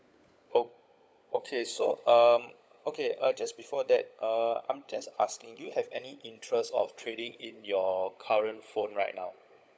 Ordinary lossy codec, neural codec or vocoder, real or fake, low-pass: none; codec, 16 kHz, 16 kbps, FunCodec, trained on LibriTTS, 50 frames a second; fake; none